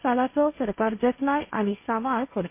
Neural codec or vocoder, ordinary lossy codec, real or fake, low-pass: codec, 16 kHz, 1.1 kbps, Voila-Tokenizer; MP3, 24 kbps; fake; 3.6 kHz